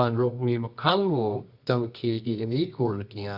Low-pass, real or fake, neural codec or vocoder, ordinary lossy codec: 5.4 kHz; fake; codec, 24 kHz, 0.9 kbps, WavTokenizer, medium music audio release; Opus, 64 kbps